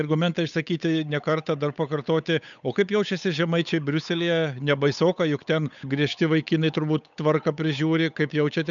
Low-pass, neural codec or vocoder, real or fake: 7.2 kHz; codec, 16 kHz, 8 kbps, FunCodec, trained on Chinese and English, 25 frames a second; fake